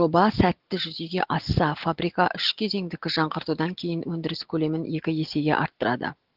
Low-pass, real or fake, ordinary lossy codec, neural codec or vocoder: 5.4 kHz; real; Opus, 16 kbps; none